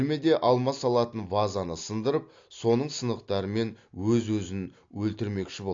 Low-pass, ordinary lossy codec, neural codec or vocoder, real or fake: 7.2 kHz; AAC, 48 kbps; none; real